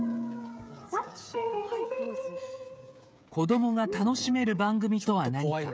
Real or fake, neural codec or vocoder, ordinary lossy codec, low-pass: fake; codec, 16 kHz, 16 kbps, FreqCodec, smaller model; none; none